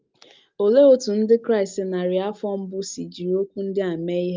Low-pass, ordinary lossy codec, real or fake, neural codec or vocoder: 7.2 kHz; Opus, 24 kbps; real; none